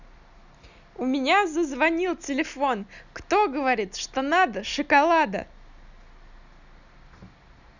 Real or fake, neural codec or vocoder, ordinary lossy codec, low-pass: real; none; none; 7.2 kHz